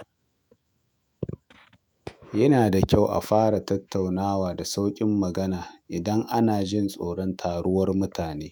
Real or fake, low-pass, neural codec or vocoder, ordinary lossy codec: fake; none; autoencoder, 48 kHz, 128 numbers a frame, DAC-VAE, trained on Japanese speech; none